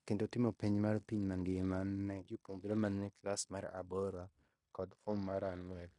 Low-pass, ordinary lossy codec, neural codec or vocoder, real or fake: 10.8 kHz; AAC, 48 kbps; codec, 16 kHz in and 24 kHz out, 0.9 kbps, LongCat-Audio-Codec, fine tuned four codebook decoder; fake